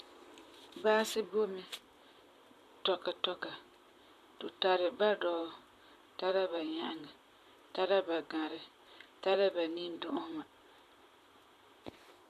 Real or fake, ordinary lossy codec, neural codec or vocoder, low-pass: fake; AAC, 96 kbps; vocoder, 44.1 kHz, 128 mel bands, Pupu-Vocoder; 14.4 kHz